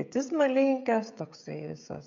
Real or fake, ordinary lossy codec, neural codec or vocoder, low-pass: fake; MP3, 64 kbps; codec, 16 kHz, 16 kbps, FreqCodec, smaller model; 7.2 kHz